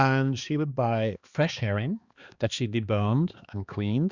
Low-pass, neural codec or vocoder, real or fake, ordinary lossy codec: 7.2 kHz; codec, 16 kHz, 2 kbps, X-Codec, HuBERT features, trained on balanced general audio; fake; Opus, 64 kbps